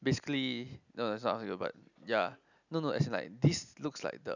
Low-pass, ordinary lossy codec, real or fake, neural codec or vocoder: 7.2 kHz; none; real; none